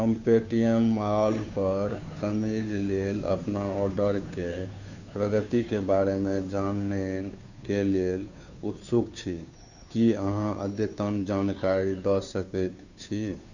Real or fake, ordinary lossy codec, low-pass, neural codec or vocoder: fake; none; 7.2 kHz; codec, 16 kHz, 2 kbps, FunCodec, trained on Chinese and English, 25 frames a second